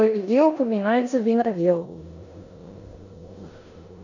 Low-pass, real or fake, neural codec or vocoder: 7.2 kHz; fake; codec, 16 kHz in and 24 kHz out, 0.9 kbps, LongCat-Audio-Codec, four codebook decoder